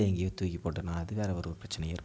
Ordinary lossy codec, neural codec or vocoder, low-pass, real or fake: none; none; none; real